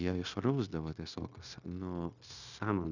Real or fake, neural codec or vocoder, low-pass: fake; codec, 16 kHz, 0.9 kbps, LongCat-Audio-Codec; 7.2 kHz